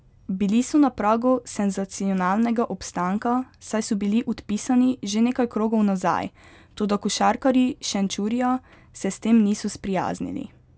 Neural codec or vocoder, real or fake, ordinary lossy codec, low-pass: none; real; none; none